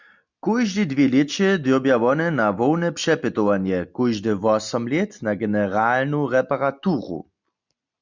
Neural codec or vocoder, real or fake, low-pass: none; real; 7.2 kHz